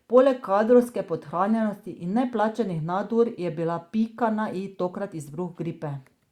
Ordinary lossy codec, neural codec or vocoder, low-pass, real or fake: Opus, 64 kbps; none; 19.8 kHz; real